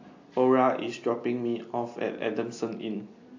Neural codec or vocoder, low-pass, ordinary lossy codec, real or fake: none; 7.2 kHz; AAC, 48 kbps; real